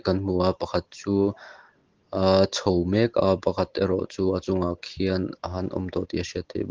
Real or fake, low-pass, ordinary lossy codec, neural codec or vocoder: real; 7.2 kHz; Opus, 16 kbps; none